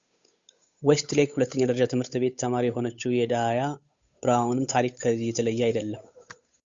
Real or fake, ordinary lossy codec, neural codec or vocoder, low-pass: fake; Opus, 64 kbps; codec, 16 kHz, 8 kbps, FunCodec, trained on Chinese and English, 25 frames a second; 7.2 kHz